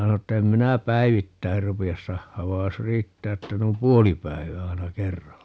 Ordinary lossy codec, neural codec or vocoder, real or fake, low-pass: none; none; real; none